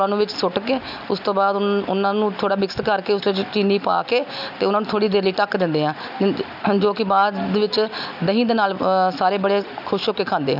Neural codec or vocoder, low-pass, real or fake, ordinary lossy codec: none; 5.4 kHz; real; none